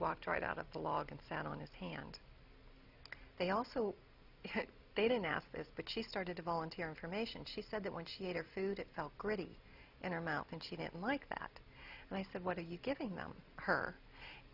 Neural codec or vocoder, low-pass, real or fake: none; 5.4 kHz; real